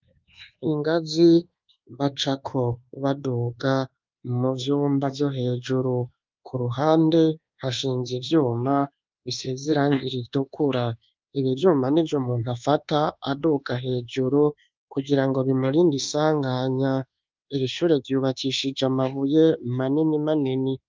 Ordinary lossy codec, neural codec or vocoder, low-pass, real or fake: Opus, 24 kbps; codec, 24 kHz, 1.2 kbps, DualCodec; 7.2 kHz; fake